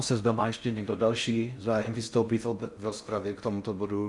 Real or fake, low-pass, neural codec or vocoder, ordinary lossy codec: fake; 10.8 kHz; codec, 16 kHz in and 24 kHz out, 0.6 kbps, FocalCodec, streaming, 4096 codes; Opus, 64 kbps